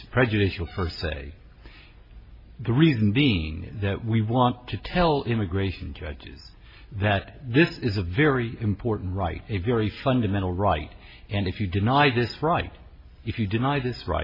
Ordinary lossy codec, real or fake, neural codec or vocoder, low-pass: MP3, 24 kbps; real; none; 5.4 kHz